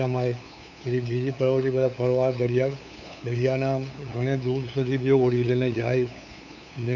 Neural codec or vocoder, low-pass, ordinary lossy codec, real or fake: codec, 16 kHz, 8 kbps, FunCodec, trained on LibriTTS, 25 frames a second; 7.2 kHz; none; fake